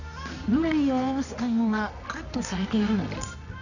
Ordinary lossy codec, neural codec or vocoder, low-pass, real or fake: none; codec, 24 kHz, 0.9 kbps, WavTokenizer, medium music audio release; 7.2 kHz; fake